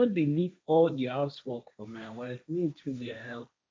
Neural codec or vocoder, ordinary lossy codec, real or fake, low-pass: codec, 16 kHz, 1.1 kbps, Voila-Tokenizer; none; fake; none